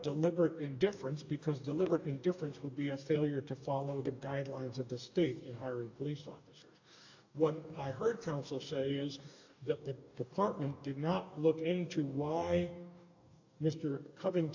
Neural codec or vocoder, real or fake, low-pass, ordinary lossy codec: codec, 44.1 kHz, 2.6 kbps, DAC; fake; 7.2 kHz; AAC, 48 kbps